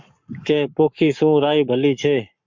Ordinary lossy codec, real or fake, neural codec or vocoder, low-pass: MP3, 64 kbps; fake; codec, 44.1 kHz, 7.8 kbps, Pupu-Codec; 7.2 kHz